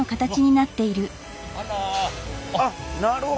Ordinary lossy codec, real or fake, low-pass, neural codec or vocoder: none; real; none; none